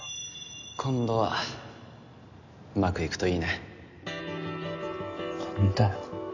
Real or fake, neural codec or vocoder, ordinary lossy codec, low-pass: real; none; none; 7.2 kHz